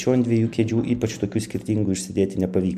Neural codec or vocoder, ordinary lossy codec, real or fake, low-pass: none; MP3, 96 kbps; real; 14.4 kHz